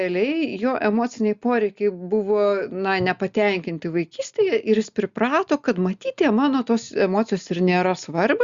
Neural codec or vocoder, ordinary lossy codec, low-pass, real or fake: none; Opus, 64 kbps; 7.2 kHz; real